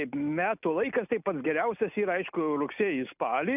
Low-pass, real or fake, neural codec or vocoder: 3.6 kHz; real; none